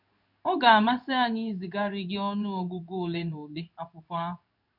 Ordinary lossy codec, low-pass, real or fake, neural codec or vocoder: none; 5.4 kHz; fake; codec, 16 kHz in and 24 kHz out, 1 kbps, XY-Tokenizer